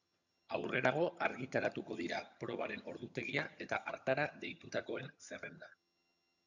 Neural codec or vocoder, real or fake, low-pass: vocoder, 22.05 kHz, 80 mel bands, HiFi-GAN; fake; 7.2 kHz